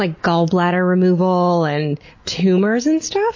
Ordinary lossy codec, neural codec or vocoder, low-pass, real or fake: MP3, 32 kbps; none; 7.2 kHz; real